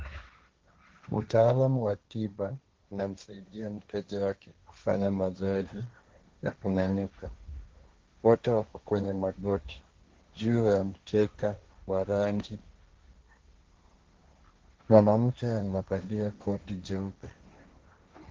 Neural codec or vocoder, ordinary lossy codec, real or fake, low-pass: codec, 16 kHz, 1.1 kbps, Voila-Tokenizer; Opus, 16 kbps; fake; 7.2 kHz